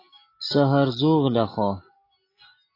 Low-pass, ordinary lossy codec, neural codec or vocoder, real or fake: 5.4 kHz; AAC, 24 kbps; none; real